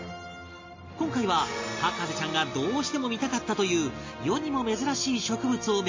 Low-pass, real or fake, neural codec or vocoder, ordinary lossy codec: 7.2 kHz; real; none; MP3, 32 kbps